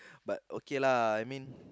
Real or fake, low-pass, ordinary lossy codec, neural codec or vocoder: real; none; none; none